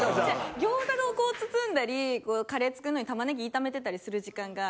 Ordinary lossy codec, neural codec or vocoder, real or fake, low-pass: none; none; real; none